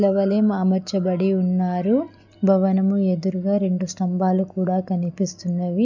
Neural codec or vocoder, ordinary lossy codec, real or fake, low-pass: none; none; real; 7.2 kHz